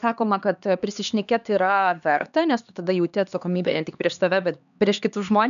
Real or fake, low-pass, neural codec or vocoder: fake; 7.2 kHz; codec, 16 kHz, 2 kbps, X-Codec, HuBERT features, trained on LibriSpeech